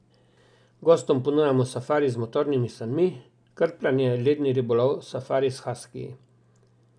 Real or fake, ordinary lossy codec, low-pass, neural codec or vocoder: real; none; 9.9 kHz; none